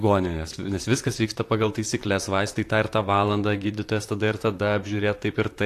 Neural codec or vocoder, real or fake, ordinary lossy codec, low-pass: vocoder, 44.1 kHz, 128 mel bands, Pupu-Vocoder; fake; AAC, 64 kbps; 14.4 kHz